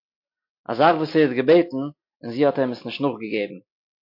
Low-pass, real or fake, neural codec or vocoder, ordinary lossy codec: 5.4 kHz; real; none; MP3, 32 kbps